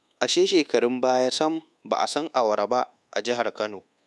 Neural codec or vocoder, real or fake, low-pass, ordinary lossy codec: codec, 24 kHz, 1.2 kbps, DualCodec; fake; none; none